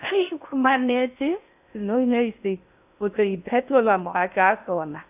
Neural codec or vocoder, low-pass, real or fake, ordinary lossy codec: codec, 16 kHz in and 24 kHz out, 0.6 kbps, FocalCodec, streaming, 4096 codes; 3.6 kHz; fake; AAC, 32 kbps